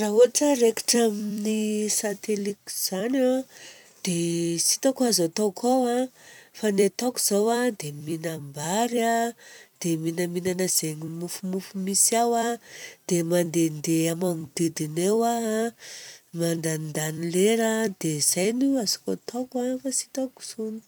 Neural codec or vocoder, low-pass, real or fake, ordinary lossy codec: vocoder, 44.1 kHz, 128 mel bands every 256 samples, BigVGAN v2; none; fake; none